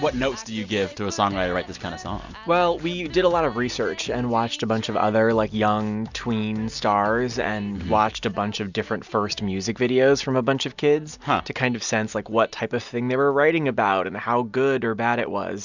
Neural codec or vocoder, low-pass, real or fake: none; 7.2 kHz; real